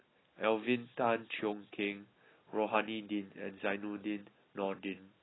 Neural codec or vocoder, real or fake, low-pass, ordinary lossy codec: none; real; 7.2 kHz; AAC, 16 kbps